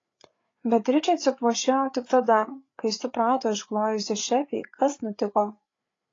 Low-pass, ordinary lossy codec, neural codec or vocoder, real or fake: 7.2 kHz; AAC, 32 kbps; codec, 16 kHz, 8 kbps, FreqCodec, larger model; fake